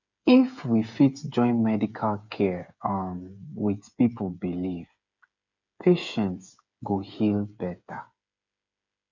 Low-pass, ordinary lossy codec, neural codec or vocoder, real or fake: 7.2 kHz; none; codec, 16 kHz, 8 kbps, FreqCodec, smaller model; fake